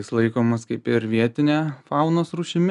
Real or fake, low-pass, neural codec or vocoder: real; 10.8 kHz; none